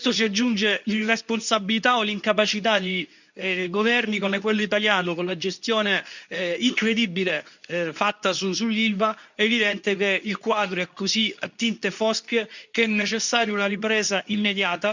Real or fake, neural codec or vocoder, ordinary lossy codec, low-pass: fake; codec, 24 kHz, 0.9 kbps, WavTokenizer, medium speech release version 2; none; 7.2 kHz